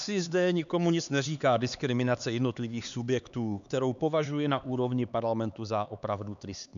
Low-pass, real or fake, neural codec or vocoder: 7.2 kHz; fake; codec, 16 kHz, 4 kbps, X-Codec, HuBERT features, trained on LibriSpeech